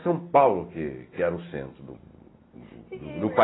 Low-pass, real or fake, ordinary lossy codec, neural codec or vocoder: 7.2 kHz; real; AAC, 16 kbps; none